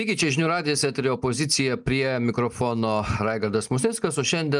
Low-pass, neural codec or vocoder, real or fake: 10.8 kHz; none; real